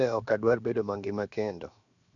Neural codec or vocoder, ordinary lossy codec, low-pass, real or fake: codec, 16 kHz, 0.7 kbps, FocalCodec; none; 7.2 kHz; fake